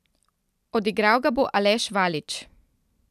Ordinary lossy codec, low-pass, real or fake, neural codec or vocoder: none; 14.4 kHz; real; none